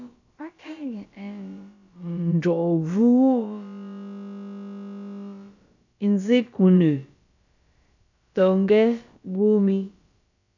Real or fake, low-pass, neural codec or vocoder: fake; 7.2 kHz; codec, 16 kHz, about 1 kbps, DyCAST, with the encoder's durations